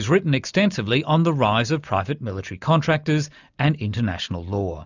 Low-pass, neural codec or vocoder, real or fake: 7.2 kHz; none; real